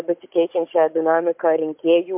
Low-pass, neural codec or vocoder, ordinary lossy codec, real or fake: 3.6 kHz; codec, 16 kHz, 16 kbps, FunCodec, trained on Chinese and English, 50 frames a second; MP3, 32 kbps; fake